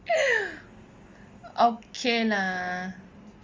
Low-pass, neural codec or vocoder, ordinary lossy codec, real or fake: 7.2 kHz; none; Opus, 32 kbps; real